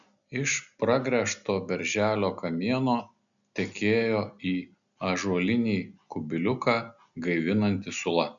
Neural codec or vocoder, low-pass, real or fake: none; 7.2 kHz; real